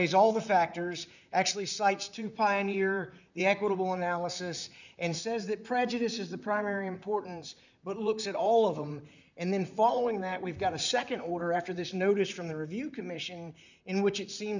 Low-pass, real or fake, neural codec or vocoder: 7.2 kHz; fake; vocoder, 44.1 kHz, 128 mel bands, Pupu-Vocoder